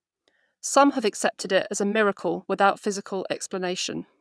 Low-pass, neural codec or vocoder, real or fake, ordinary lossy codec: none; vocoder, 22.05 kHz, 80 mel bands, Vocos; fake; none